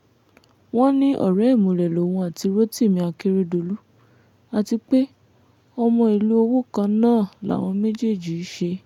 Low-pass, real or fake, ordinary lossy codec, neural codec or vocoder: 19.8 kHz; real; none; none